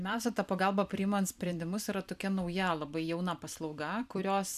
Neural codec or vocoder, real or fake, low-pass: vocoder, 44.1 kHz, 128 mel bands every 256 samples, BigVGAN v2; fake; 14.4 kHz